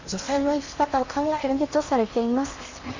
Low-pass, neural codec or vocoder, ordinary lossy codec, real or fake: 7.2 kHz; codec, 16 kHz in and 24 kHz out, 0.8 kbps, FocalCodec, streaming, 65536 codes; Opus, 64 kbps; fake